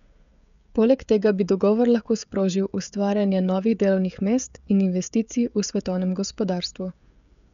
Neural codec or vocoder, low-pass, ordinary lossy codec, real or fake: codec, 16 kHz, 16 kbps, FreqCodec, smaller model; 7.2 kHz; none; fake